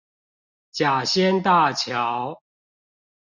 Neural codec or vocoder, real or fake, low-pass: none; real; 7.2 kHz